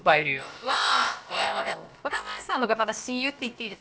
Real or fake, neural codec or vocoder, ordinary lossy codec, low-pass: fake; codec, 16 kHz, about 1 kbps, DyCAST, with the encoder's durations; none; none